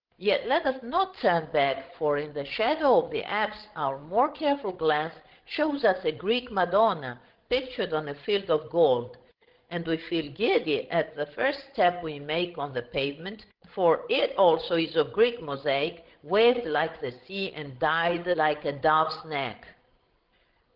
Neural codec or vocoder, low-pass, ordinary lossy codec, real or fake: codec, 16 kHz, 16 kbps, FreqCodec, larger model; 5.4 kHz; Opus, 16 kbps; fake